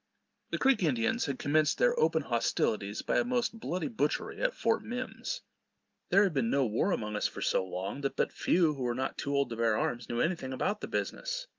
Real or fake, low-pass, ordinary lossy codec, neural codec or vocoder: real; 7.2 kHz; Opus, 24 kbps; none